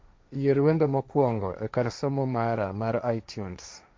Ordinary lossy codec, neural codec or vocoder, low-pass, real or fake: none; codec, 16 kHz, 1.1 kbps, Voila-Tokenizer; none; fake